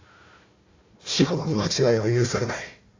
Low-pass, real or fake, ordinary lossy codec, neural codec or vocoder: 7.2 kHz; fake; AAC, 32 kbps; codec, 16 kHz, 1 kbps, FunCodec, trained on Chinese and English, 50 frames a second